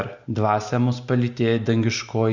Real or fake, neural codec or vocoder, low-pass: real; none; 7.2 kHz